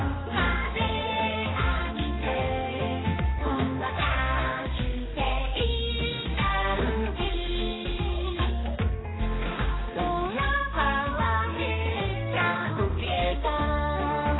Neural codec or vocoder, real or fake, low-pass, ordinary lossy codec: codec, 16 kHz, 2 kbps, X-Codec, HuBERT features, trained on balanced general audio; fake; 7.2 kHz; AAC, 16 kbps